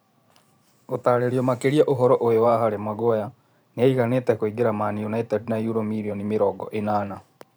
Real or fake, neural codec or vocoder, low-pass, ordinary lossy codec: fake; vocoder, 44.1 kHz, 128 mel bands every 512 samples, BigVGAN v2; none; none